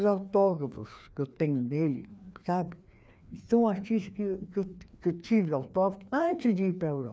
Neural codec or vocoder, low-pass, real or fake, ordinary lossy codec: codec, 16 kHz, 2 kbps, FreqCodec, larger model; none; fake; none